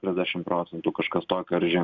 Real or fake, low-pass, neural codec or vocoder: real; 7.2 kHz; none